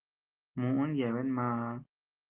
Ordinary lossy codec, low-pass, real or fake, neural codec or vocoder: Opus, 16 kbps; 3.6 kHz; real; none